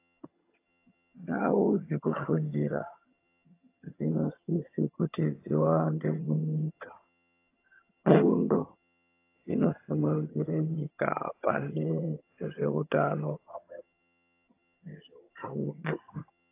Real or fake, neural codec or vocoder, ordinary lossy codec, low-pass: fake; vocoder, 22.05 kHz, 80 mel bands, HiFi-GAN; AAC, 24 kbps; 3.6 kHz